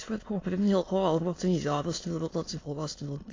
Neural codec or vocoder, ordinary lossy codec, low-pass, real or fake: autoencoder, 22.05 kHz, a latent of 192 numbers a frame, VITS, trained on many speakers; AAC, 32 kbps; 7.2 kHz; fake